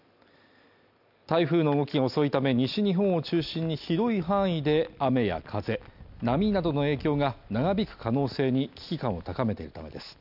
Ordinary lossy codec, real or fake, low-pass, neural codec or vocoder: none; real; 5.4 kHz; none